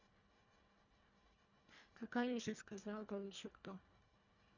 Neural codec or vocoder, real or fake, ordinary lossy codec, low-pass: codec, 24 kHz, 1.5 kbps, HILCodec; fake; none; 7.2 kHz